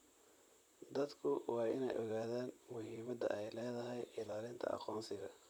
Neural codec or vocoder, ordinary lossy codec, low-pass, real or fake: vocoder, 44.1 kHz, 128 mel bands, Pupu-Vocoder; none; none; fake